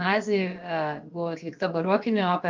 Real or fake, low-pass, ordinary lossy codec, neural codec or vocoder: fake; 7.2 kHz; Opus, 24 kbps; codec, 16 kHz, about 1 kbps, DyCAST, with the encoder's durations